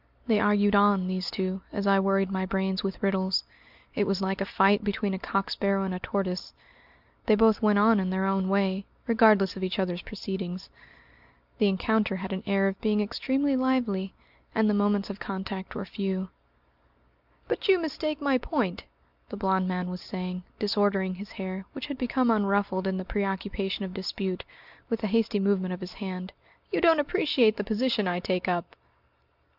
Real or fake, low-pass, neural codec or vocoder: real; 5.4 kHz; none